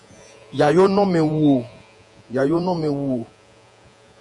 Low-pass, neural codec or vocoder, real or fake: 10.8 kHz; vocoder, 48 kHz, 128 mel bands, Vocos; fake